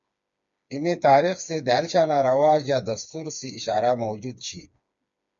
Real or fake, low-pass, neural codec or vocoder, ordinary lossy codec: fake; 7.2 kHz; codec, 16 kHz, 4 kbps, FreqCodec, smaller model; MP3, 64 kbps